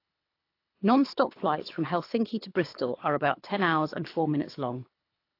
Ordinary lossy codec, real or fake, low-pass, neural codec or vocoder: AAC, 32 kbps; fake; 5.4 kHz; codec, 24 kHz, 3 kbps, HILCodec